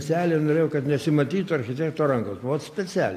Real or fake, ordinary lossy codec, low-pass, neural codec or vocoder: real; AAC, 64 kbps; 14.4 kHz; none